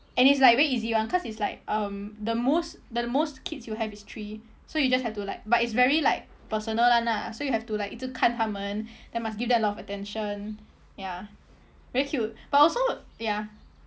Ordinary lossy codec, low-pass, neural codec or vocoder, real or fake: none; none; none; real